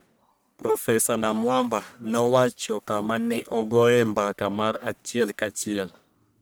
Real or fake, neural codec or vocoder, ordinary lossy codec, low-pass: fake; codec, 44.1 kHz, 1.7 kbps, Pupu-Codec; none; none